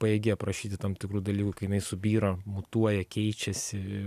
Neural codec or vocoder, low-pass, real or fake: none; 14.4 kHz; real